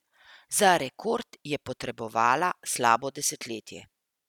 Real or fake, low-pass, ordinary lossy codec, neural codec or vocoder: real; 19.8 kHz; none; none